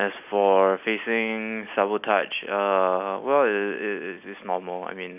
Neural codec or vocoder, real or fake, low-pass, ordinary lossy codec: none; real; 3.6 kHz; none